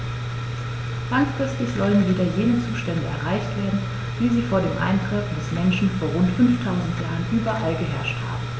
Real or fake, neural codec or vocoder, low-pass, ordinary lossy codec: real; none; none; none